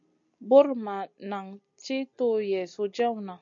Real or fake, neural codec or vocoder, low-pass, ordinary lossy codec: real; none; 7.2 kHz; AAC, 64 kbps